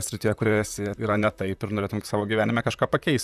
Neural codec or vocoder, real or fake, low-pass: vocoder, 44.1 kHz, 128 mel bands, Pupu-Vocoder; fake; 14.4 kHz